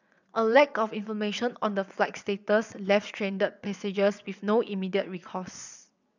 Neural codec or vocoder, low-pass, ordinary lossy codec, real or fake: vocoder, 22.05 kHz, 80 mel bands, WaveNeXt; 7.2 kHz; none; fake